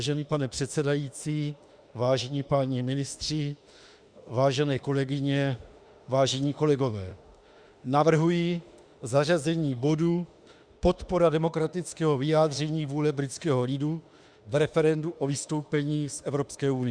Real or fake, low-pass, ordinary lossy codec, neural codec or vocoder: fake; 9.9 kHz; Opus, 64 kbps; autoencoder, 48 kHz, 32 numbers a frame, DAC-VAE, trained on Japanese speech